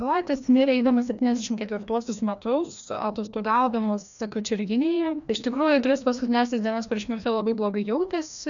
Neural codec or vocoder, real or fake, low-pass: codec, 16 kHz, 1 kbps, FreqCodec, larger model; fake; 7.2 kHz